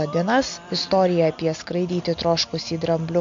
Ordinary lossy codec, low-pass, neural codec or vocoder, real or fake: MP3, 48 kbps; 7.2 kHz; none; real